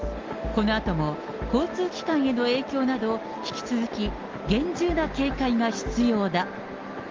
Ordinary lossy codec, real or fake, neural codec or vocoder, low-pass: Opus, 32 kbps; real; none; 7.2 kHz